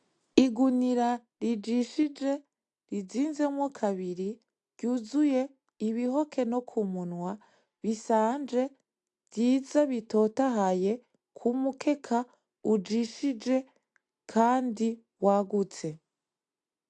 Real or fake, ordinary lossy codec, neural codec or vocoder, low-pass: real; AAC, 64 kbps; none; 10.8 kHz